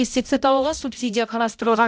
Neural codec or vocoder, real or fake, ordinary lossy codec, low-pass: codec, 16 kHz, 0.5 kbps, X-Codec, HuBERT features, trained on balanced general audio; fake; none; none